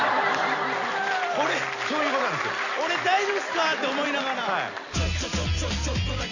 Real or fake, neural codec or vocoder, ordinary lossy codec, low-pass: real; none; none; 7.2 kHz